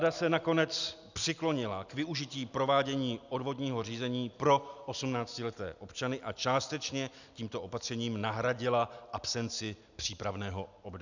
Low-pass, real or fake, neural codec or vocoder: 7.2 kHz; real; none